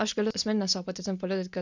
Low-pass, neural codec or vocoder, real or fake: 7.2 kHz; none; real